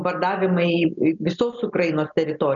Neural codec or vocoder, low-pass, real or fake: none; 7.2 kHz; real